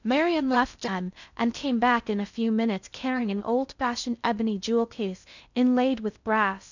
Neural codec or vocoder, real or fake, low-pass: codec, 16 kHz in and 24 kHz out, 0.6 kbps, FocalCodec, streaming, 2048 codes; fake; 7.2 kHz